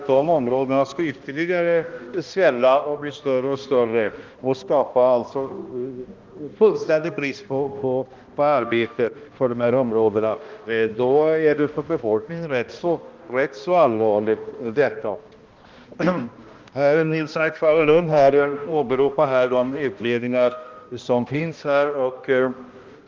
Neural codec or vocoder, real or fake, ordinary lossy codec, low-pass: codec, 16 kHz, 1 kbps, X-Codec, HuBERT features, trained on balanced general audio; fake; Opus, 32 kbps; 7.2 kHz